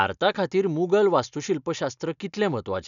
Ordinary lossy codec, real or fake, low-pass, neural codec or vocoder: none; real; 7.2 kHz; none